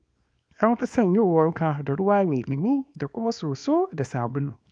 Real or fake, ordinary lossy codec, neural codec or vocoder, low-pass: fake; none; codec, 24 kHz, 0.9 kbps, WavTokenizer, small release; 9.9 kHz